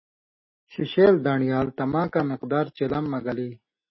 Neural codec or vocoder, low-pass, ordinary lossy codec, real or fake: none; 7.2 kHz; MP3, 24 kbps; real